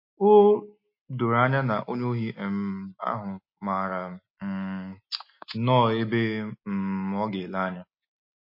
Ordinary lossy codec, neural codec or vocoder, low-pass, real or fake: MP3, 32 kbps; none; 5.4 kHz; real